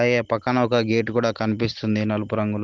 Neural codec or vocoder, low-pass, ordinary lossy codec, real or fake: none; 7.2 kHz; Opus, 16 kbps; real